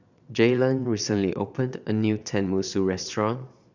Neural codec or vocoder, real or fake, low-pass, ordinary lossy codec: vocoder, 22.05 kHz, 80 mel bands, Vocos; fake; 7.2 kHz; none